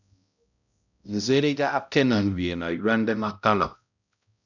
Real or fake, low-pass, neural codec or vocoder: fake; 7.2 kHz; codec, 16 kHz, 0.5 kbps, X-Codec, HuBERT features, trained on balanced general audio